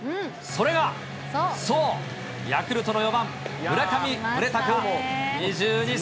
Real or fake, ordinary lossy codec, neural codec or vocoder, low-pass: real; none; none; none